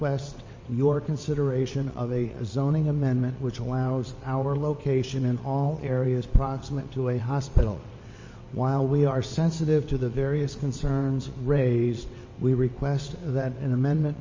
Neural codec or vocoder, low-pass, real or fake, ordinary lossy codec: vocoder, 22.05 kHz, 80 mel bands, WaveNeXt; 7.2 kHz; fake; MP3, 32 kbps